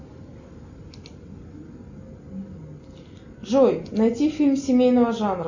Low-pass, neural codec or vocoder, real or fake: 7.2 kHz; none; real